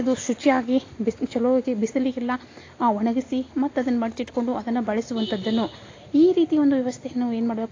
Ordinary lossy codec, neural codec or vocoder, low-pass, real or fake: AAC, 32 kbps; none; 7.2 kHz; real